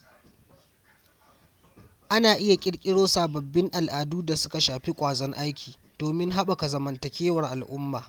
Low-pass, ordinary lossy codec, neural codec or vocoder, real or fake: 19.8 kHz; Opus, 32 kbps; none; real